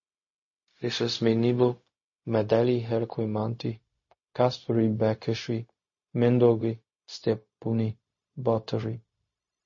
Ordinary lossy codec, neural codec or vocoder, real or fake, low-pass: MP3, 32 kbps; codec, 16 kHz, 0.4 kbps, LongCat-Audio-Codec; fake; 7.2 kHz